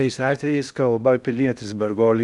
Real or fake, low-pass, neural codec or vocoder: fake; 10.8 kHz; codec, 16 kHz in and 24 kHz out, 0.6 kbps, FocalCodec, streaming, 2048 codes